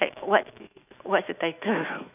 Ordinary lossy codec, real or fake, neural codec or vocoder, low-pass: none; fake; autoencoder, 48 kHz, 128 numbers a frame, DAC-VAE, trained on Japanese speech; 3.6 kHz